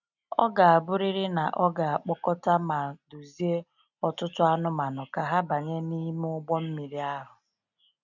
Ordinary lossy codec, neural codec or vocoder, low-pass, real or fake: none; none; 7.2 kHz; real